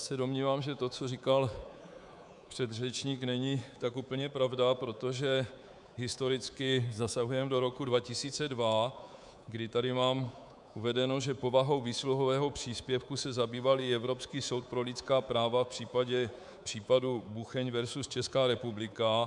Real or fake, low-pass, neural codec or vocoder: fake; 10.8 kHz; codec, 24 kHz, 3.1 kbps, DualCodec